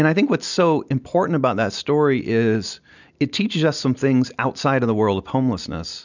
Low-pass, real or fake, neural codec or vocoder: 7.2 kHz; real; none